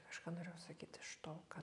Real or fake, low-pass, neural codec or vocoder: real; 10.8 kHz; none